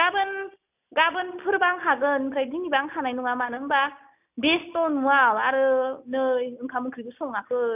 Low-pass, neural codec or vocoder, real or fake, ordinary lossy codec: 3.6 kHz; none; real; none